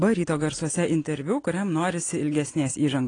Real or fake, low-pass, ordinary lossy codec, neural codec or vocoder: real; 9.9 kHz; AAC, 32 kbps; none